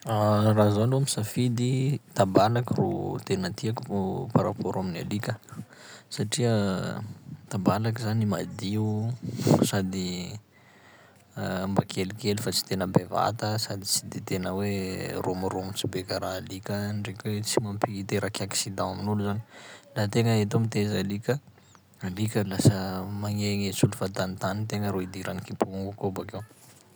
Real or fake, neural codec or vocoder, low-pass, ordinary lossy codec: real; none; none; none